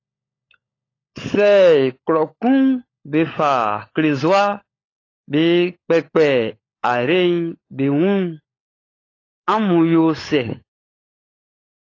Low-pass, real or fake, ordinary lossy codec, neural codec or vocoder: 7.2 kHz; fake; AAC, 32 kbps; codec, 16 kHz, 16 kbps, FunCodec, trained on LibriTTS, 50 frames a second